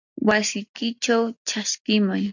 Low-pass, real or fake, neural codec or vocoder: 7.2 kHz; real; none